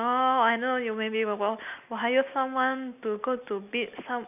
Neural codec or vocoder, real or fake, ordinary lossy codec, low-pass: none; real; none; 3.6 kHz